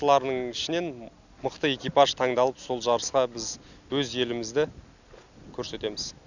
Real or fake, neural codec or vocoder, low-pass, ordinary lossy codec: real; none; 7.2 kHz; none